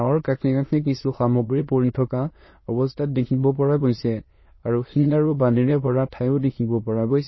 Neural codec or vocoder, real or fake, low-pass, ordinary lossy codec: autoencoder, 22.05 kHz, a latent of 192 numbers a frame, VITS, trained on many speakers; fake; 7.2 kHz; MP3, 24 kbps